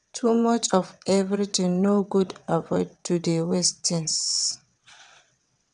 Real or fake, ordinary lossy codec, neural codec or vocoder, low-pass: fake; none; vocoder, 22.05 kHz, 80 mel bands, WaveNeXt; 9.9 kHz